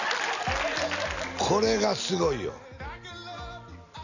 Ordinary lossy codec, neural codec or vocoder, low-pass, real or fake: none; none; 7.2 kHz; real